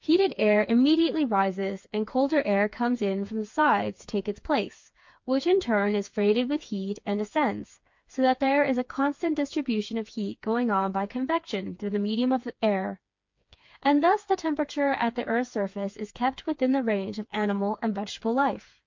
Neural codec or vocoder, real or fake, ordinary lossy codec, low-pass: codec, 16 kHz, 4 kbps, FreqCodec, smaller model; fake; MP3, 48 kbps; 7.2 kHz